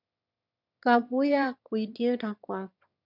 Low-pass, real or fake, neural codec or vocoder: 5.4 kHz; fake; autoencoder, 22.05 kHz, a latent of 192 numbers a frame, VITS, trained on one speaker